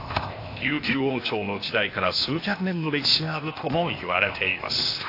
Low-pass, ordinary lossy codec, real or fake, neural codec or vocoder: 5.4 kHz; AAC, 32 kbps; fake; codec, 16 kHz, 0.8 kbps, ZipCodec